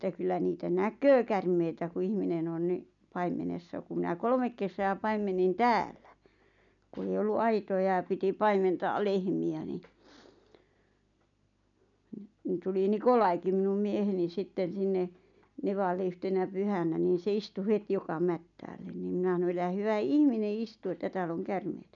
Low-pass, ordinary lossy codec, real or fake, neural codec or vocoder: 7.2 kHz; none; real; none